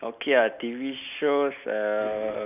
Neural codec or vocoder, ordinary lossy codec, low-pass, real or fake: none; none; 3.6 kHz; real